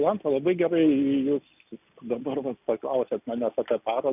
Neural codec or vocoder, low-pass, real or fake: none; 3.6 kHz; real